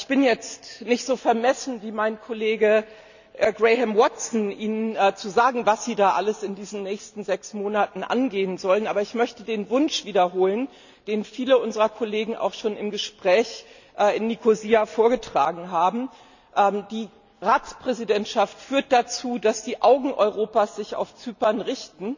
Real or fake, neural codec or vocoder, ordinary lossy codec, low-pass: real; none; none; 7.2 kHz